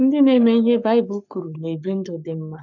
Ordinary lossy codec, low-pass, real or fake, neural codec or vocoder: none; 7.2 kHz; fake; codec, 44.1 kHz, 7.8 kbps, Pupu-Codec